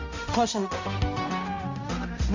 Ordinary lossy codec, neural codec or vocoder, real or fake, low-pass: MP3, 48 kbps; codec, 16 kHz, 0.5 kbps, X-Codec, HuBERT features, trained on balanced general audio; fake; 7.2 kHz